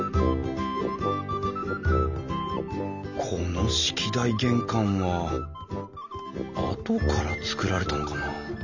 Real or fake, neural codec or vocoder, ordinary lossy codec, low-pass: real; none; none; 7.2 kHz